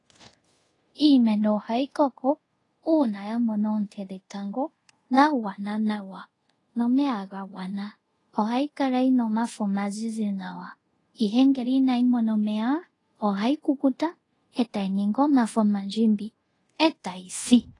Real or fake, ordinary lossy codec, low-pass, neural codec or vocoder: fake; AAC, 32 kbps; 10.8 kHz; codec, 24 kHz, 0.5 kbps, DualCodec